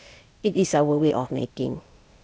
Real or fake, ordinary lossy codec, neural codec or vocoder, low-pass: fake; none; codec, 16 kHz, 0.8 kbps, ZipCodec; none